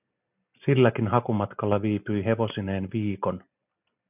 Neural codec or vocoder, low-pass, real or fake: none; 3.6 kHz; real